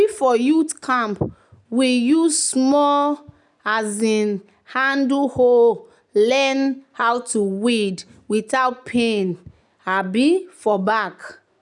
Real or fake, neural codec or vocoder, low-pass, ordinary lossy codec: real; none; 10.8 kHz; none